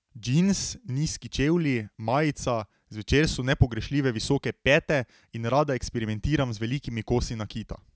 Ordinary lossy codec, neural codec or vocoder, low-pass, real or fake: none; none; none; real